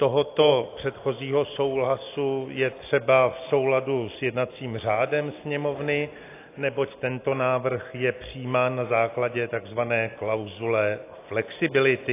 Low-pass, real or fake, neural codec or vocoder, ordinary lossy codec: 3.6 kHz; real; none; AAC, 24 kbps